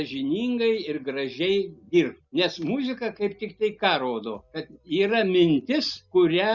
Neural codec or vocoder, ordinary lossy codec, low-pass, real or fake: none; Opus, 64 kbps; 7.2 kHz; real